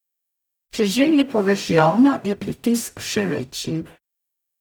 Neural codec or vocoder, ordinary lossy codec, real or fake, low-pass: codec, 44.1 kHz, 0.9 kbps, DAC; none; fake; none